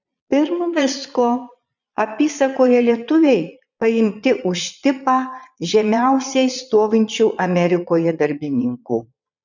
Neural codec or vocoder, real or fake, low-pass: vocoder, 22.05 kHz, 80 mel bands, Vocos; fake; 7.2 kHz